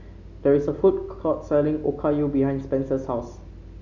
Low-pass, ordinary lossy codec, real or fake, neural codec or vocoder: 7.2 kHz; none; real; none